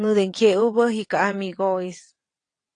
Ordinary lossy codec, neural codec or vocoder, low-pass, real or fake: AAC, 48 kbps; vocoder, 22.05 kHz, 80 mel bands, WaveNeXt; 9.9 kHz; fake